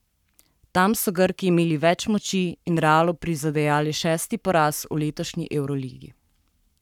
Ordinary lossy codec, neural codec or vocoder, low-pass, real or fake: none; codec, 44.1 kHz, 7.8 kbps, Pupu-Codec; 19.8 kHz; fake